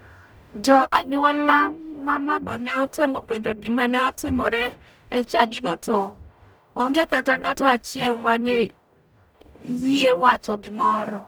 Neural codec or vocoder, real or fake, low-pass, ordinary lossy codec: codec, 44.1 kHz, 0.9 kbps, DAC; fake; none; none